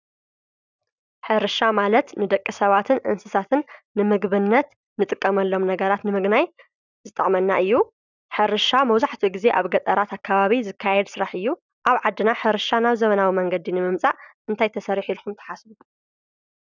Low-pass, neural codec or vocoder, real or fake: 7.2 kHz; none; real